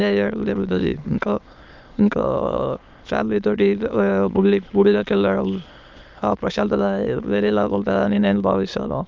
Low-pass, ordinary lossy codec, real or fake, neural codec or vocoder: 7.2 kHz; Opus, 24 kbps; fake; autoencoder, 22.05 kHz, a latent of 192 numbers a frame, VITS, trained on many speakers